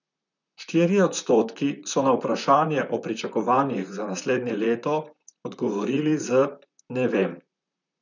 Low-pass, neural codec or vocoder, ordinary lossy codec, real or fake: 7.2 kHz; vocoder, 44.1 kHz, 128 mel bands, Pupu-Vocoder; none; fake